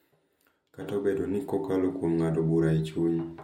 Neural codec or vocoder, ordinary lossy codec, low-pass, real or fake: none; MP3, 64 kbps; 19.8 kHz; real